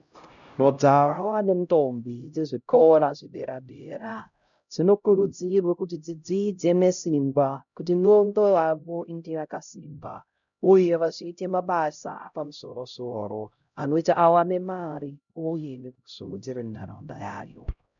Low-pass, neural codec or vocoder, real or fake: 7.2 kHz; codec, 16 kHz, 0.5 kbps, X-Codec, HuBERT features, trained on LibriSpeech; fake